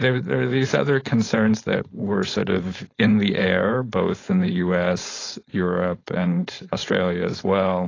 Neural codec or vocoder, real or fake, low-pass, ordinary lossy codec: codec, 16 kHz, 4.8 kbps, FACodec; fake; 7.2 kHz; AAC, 32 kbps